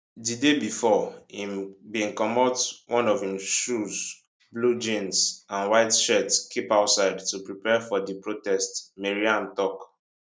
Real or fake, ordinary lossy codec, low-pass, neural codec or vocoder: real; none; none; none